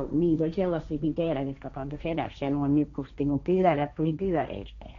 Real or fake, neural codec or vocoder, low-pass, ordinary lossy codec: fake; codec, 16 kHz, 1.1 kbps, Voila-Tokenizer; 7.2 kHz; none